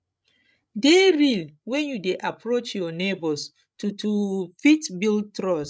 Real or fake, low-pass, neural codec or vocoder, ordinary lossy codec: fake; none; codec, 16 kHz, 16 kbps, FreqCodec, larger model; none